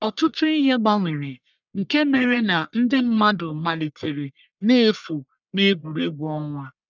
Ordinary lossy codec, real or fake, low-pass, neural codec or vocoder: none; fake; 7.2 kHz; codec, 44.1 kHz, 1.7 kbps, Pupu-Codec